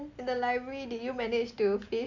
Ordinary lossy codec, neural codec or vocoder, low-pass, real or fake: MP3, 64 kbps; none; 7.2 kHz; real